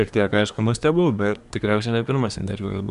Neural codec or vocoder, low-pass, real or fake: codec, 24 kHz, 1 kbps, SNAC; 10.8 kHz; fake